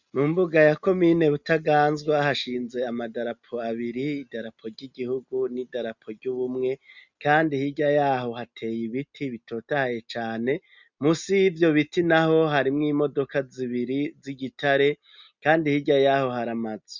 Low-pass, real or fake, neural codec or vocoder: 7.2 kHz; real; none